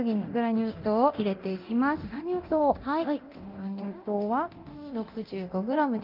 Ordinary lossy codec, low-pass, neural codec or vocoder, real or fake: Opus, 32 kbps; 5.4 kHz; codec, 24 kHz, 0.9 kbps, DualCodec; fake